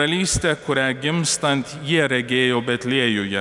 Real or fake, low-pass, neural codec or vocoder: real; 10.8 kHz; none